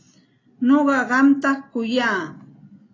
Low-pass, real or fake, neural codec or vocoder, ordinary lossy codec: 7.2 kHz; real; none; AAC, 32 kbps